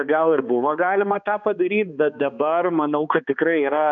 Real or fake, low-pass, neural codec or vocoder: fake; 7.2 kHz; codec, 16 kHz, 2 kbps, X-Codec, HuBERT features, trained on balanced general audio